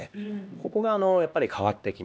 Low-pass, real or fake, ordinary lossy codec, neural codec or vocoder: none; fake; none; codec, 16 kHz, 2 kbps, X-Codec, HuBERT features, trained on LibriSpeech